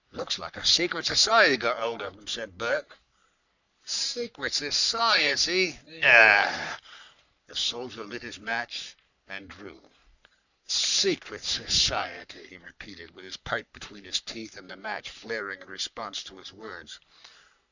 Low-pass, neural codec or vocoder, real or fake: 7.2 kHz; codec, 44.1 kHz, 3.4 kbps, Pupu-Codec; fake